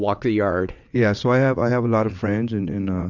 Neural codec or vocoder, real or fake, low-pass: vocoder, 22.05 kHz, 80 mel bands, WaveNeXt; fake; 7.2 kHz